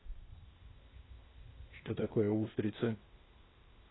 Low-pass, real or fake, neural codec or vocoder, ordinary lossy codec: 7.2 kHz; fake; codec, 16 kHz, 0.8 kbps, ZipCodec; AAC, 16 kbps